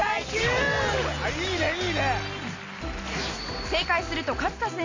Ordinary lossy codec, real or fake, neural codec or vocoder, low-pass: AAC, 32 kbps; real; none; 7.2 kHz